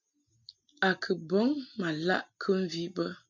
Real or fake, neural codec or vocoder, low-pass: real; none; 7.2 kHz